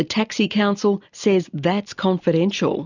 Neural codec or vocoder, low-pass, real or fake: none; 7.2 kHz; real